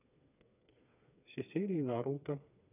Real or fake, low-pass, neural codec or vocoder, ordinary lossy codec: fake; 3.6 kHz; codec, 16 kHz, 4 kbps, FreqCodec, smaller model; none